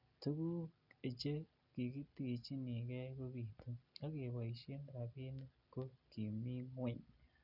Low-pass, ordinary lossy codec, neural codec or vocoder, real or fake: 5.4 kHz; none; none; real